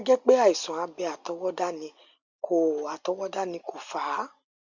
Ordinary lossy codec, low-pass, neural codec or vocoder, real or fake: Opus, 64 kbps; 7.2 kHz; none; real